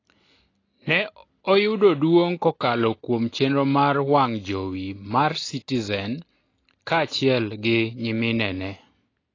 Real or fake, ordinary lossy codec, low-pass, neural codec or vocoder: real; AAC, 32 kbps; 7.2 kHz; none